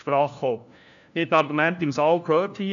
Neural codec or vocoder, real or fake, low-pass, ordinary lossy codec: codec, 16 kHz, 1 kbps, FunCodec, trained on LibriTTS, 50 frames a second; fake; 7.2 kHz; none